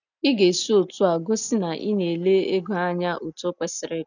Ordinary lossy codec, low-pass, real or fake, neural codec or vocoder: none; 7.2 kHz; real; none